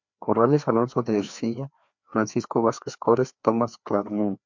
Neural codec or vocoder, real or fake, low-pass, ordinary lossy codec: codec, 16 kHz, 2 kbps, FreqCodec, larger model; fake; 7.2 kHz; MP3, 64 kbps